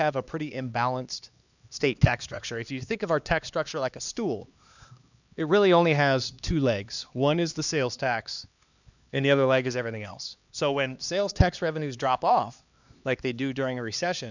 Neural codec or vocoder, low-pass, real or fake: codec, 16 kHz, 2 kbps, X-Codec, HuBERT features, trained on LibriSpeech; 7.2 kHz; fake